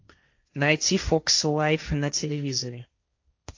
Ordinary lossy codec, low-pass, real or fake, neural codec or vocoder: AAC, 48 kbps; 7.2 kHz; fake; codec, 16 kHz, 1.1 kbps, Voila-Tokenizer